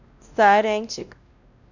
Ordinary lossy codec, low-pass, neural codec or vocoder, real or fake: none; 7.2 kHz; codec, 16 kHz, 1 kbps, X-Codec, WavLM features, trained on Multilingual LibriSpeech; fake